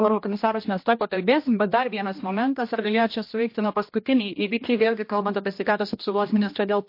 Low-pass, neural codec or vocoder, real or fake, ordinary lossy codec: 5.4 kHz; codec, 16 kHz, 1 kbps, X-Codec, HuBERT features, trained on general audio; fake; MP3, 32 kbps